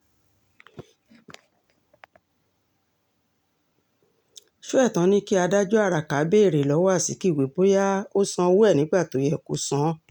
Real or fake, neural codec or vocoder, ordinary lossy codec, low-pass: real; none; none; 19.8 kHz